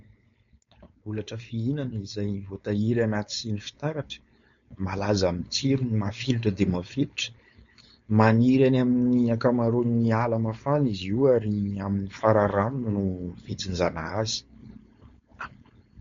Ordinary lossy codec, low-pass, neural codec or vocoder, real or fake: MP3, 48 kbps; 7.2 kHz; codec, 16 kHz, 4.8 kbps, FACodec; fake